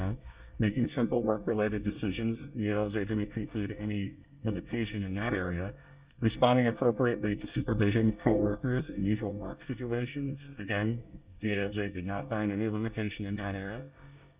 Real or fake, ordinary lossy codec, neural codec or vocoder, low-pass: fake; Opus, 64 kbps; codec, 24 kHz, 1 kbps, SNAC; 3.6 kHz